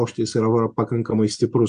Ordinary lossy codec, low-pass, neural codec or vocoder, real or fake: MP3, 96 kbps; 9.9 kHz; none; real